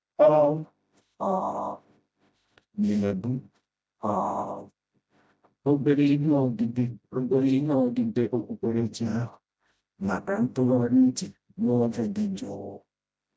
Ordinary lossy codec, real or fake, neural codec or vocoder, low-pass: none; fake; codec, 16 kHz, 0.5 kbps, FreqCodec, smaller model; none